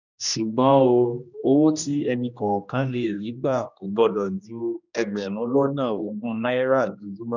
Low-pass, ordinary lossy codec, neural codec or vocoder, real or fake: 7.2 kHz; none; codec, 16 kHz, 1 kbps, X-Codec, HuBERT features, trained on general audio; fake